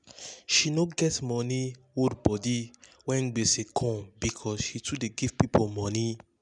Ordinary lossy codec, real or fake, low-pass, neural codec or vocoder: none; real; 10.8 kHz; none